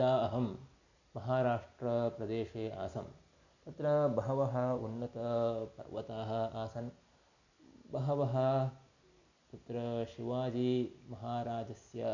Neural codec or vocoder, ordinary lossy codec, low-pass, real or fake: none; none; 7.2 kHz; real